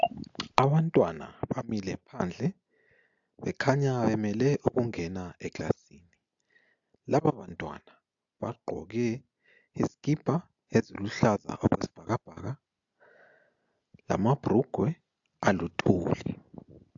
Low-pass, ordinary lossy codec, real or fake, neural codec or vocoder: 7.2 kHz; AAC, 64 kbps; real; none